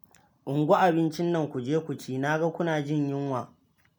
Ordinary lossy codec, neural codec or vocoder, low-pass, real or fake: none; none; none; real